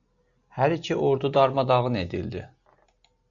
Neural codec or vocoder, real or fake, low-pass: none; real; 7.2 kHz